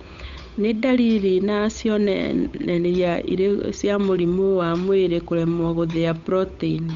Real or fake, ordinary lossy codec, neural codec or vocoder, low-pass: fake; MP3, 64 kbps; codec, 16 kHz, 8 kbps, FunCodec, trained on Chinese and English, 25 frames a second; 7.2 kHz